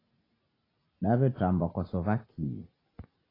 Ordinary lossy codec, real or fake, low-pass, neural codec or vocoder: AAC, 24 kbps; real; 5.4 kHz; none